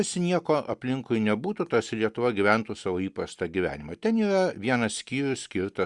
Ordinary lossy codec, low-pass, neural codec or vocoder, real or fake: Opus, 64 kbps; 10.8 kHz; none; real